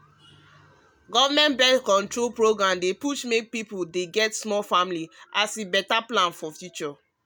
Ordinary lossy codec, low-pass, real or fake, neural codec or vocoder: none; none; real; none